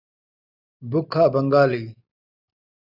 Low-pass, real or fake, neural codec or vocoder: 5.4 kHz; real; none